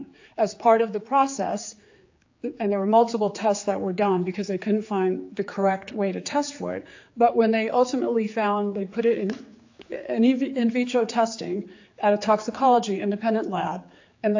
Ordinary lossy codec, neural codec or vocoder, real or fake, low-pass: AAC, 48 kbps; codec, 16 kHz, 4 kbps, X-Codec, HuBERT features, trained on general audio; fake; 7.2 kHz